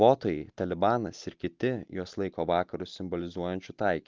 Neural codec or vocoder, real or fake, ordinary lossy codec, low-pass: none; real; Opus, 32 kbps; 7.2 kHz